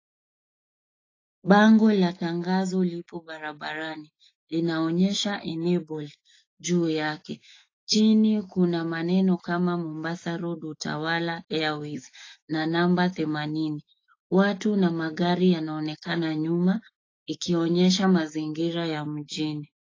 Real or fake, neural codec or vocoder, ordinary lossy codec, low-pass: fake; autoencoder, 48 kHz, 128 numbers a frame, DAC-VAE, trained on Japanese speech; AAC, 32 kbps; 7.2 kHz